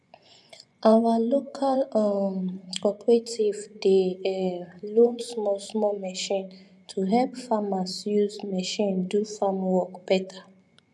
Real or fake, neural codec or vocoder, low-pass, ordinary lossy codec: fake; vocoder, 24 kHz, 100 mel bands, Vocos; none; none